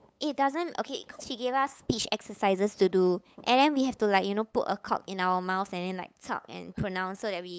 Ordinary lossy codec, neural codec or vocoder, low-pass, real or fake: none; codec, 16 kHz, 8 kbps, FunCodec, trained on LibriTTS, 25 frames a second; none; fake